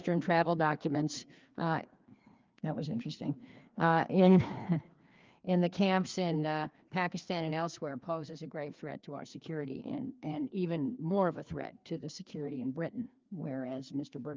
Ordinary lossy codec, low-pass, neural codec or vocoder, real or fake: Opus, 24 kbps; 7.2 kHz; codec, 16 kHz, 2 kbps, FreqCodec, larger model; fake